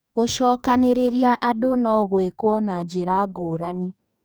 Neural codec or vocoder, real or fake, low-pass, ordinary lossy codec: codec, 44.1 kHz, 2.6 kbps, DAC; fake; none; none